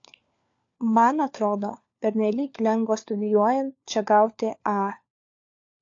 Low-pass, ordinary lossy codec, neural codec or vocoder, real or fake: 7.2 kHz; AAC, 48 kbps; codec, 16 kHz, 4 kbps, FunCodec, trained on LibriTTS, 50 frames a second; fake